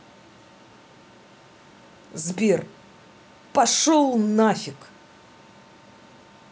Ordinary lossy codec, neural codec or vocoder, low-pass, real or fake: none; none; none; real